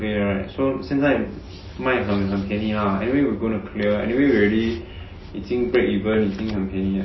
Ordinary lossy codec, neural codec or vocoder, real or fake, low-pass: MP3, 24 kbps; none; real; 7.2 kHz